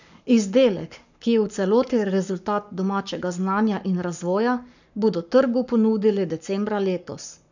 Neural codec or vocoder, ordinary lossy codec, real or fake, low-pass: codec, 44.1 kHz, 7.8 kbps, Pupu-Codec; none; fake; 7.2 kHz